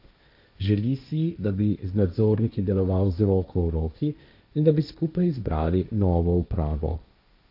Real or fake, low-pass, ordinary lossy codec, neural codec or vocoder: fake; 5.4 kHz; none; codec, 16 kHz, 1.1 kbps, Voila-Tokenizer